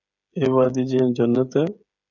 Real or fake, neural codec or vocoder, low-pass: fake; codec, 16 kHz, 8 kbps, FreqCodec, smaller model; 7.2 kHz